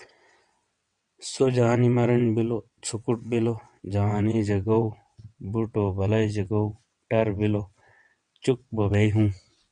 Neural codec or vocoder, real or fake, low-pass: vocoder, 22.05 kHz, 80 mel bands, WaveNeXt; fake; 9.9 kHz